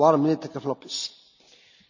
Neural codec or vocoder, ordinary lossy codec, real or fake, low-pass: none; none; real; 7.2 kHz